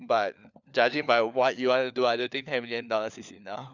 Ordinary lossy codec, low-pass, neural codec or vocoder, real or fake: none; 7.2 kHz; codec, 16 kHz, 4 kbps, FunCodec, trained on LibriTTS, 50 frames a second; fake